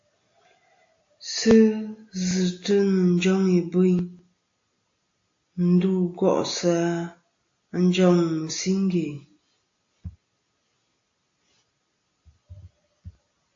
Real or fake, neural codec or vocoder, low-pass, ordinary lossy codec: real; none; 7.2 kHz; AAC, 48 kbps